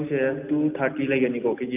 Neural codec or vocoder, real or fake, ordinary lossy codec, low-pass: none; real; none; 3.6 kHz